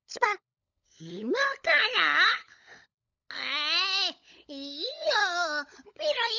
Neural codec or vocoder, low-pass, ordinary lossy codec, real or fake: codec, 16 kHz, 16 kbps, FunCodec, trained on LibriTTS, 50 frames a second; 7.2 kHz; none; fake